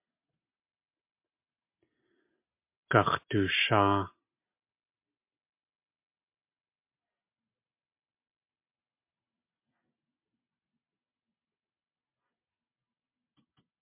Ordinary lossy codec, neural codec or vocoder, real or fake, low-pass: MP3, 32 kbps; none; real; 3.6 kHz